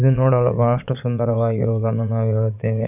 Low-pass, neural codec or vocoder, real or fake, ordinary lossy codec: 3.6 kHz; vocoder, 22.05 kHz, 80 mel bands, Vocos; fake; none